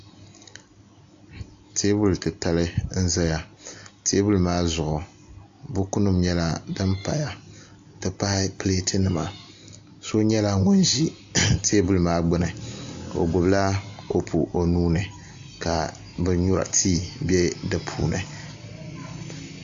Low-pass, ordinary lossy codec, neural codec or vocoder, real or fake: 7.2 kHz; AAC, 64 kbps; none; real